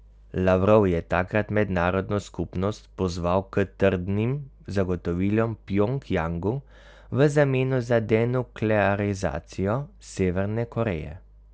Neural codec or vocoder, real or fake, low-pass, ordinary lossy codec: none; real; none; none